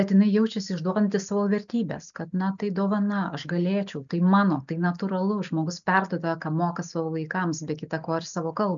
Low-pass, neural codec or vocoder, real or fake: 7.2 kHz; none; real